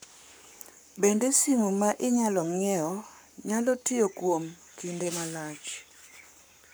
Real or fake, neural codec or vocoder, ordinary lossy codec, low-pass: fake; codec, 44.1 kHz, 7.8 kbps, Pupu-Codec; none; none